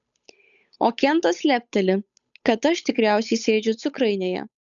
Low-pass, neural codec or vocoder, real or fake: 7.2 kHz; codec, 16 kHz, 8 kbps, FunCodec, trained on Chinese and English, 25 frames a second; fake